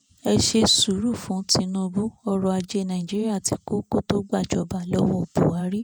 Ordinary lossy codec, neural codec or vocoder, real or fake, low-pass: none; vocoder, 48 kHz, 128 mel bands, Vocos; fake; none